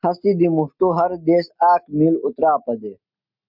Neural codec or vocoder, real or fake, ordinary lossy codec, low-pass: none; real; MP3, 48 kbps; 5.4 kHz